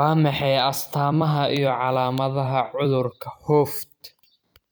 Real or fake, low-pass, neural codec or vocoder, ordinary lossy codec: fake; none; vocoder, 44.1 kHz, 128 mel bands every 256 samples, BigVGAN v2; none